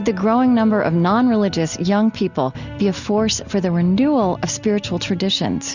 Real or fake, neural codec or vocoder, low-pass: real; none; 7.2 kHz